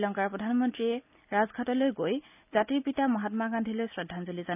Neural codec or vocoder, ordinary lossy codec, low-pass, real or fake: none; none; 3.6 kHz; real